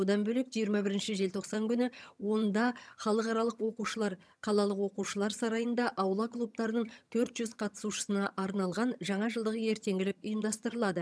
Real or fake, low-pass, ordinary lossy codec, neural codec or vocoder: fake; none; none; vocoder, 22.05 kHz, 80 mel bands, HiFi-GAN